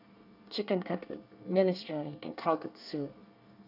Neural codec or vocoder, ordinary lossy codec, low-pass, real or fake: codec, 24 kHz, 1 kbps, SNAC; none; 5.4 kHz; fake